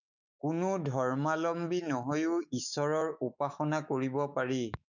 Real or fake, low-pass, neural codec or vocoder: fake; 7.2 kHz; autoencoder, 48 kHz, 128 numbers a frame, DAC-VAE, trained on Japanese speech